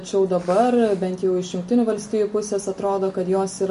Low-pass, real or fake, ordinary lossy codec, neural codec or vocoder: 10.8 kHz; real; MP3, 48 kbps; none